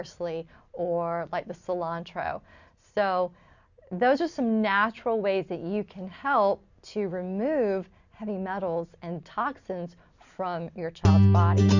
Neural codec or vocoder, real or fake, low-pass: none; real; 7.2 kHz